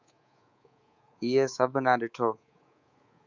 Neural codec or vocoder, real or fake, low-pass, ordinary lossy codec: codec, 24 kHz, 3.1 kbps, DualCodec; fake; 7.2 kHz; Opus, 64 kbps